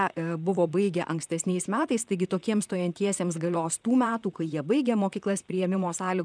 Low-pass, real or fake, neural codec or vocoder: 9.9 kHz; fake; vocoder, 44.1 kHz, 128 mel bands, Pupu-Vocoder